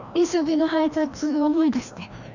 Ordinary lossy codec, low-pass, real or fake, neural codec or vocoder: none; 7.2 kHz; fake; codec, 16 kHz, 1 kbps, FreqCodec, larger model